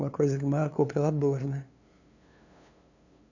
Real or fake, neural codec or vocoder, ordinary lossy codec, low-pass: fake; codec, 16 kHz, 2 kbps, FunCodec, trained on LibriTTS, 25 frames a second; none; 7.2 kHz